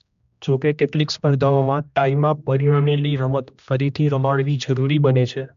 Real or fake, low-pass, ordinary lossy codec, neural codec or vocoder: fake; 7.2 kHz; MP3, 64 kbps; codec, 16 kHz, 1 kbps, X-Codec, HuBERT features, trained on general audio